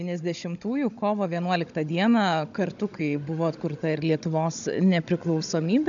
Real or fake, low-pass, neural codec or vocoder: fake; 7.2 kHz; codec, 16 kHz, 16 kbps, FunCodec, trained on Chinese and English, 50 frames a second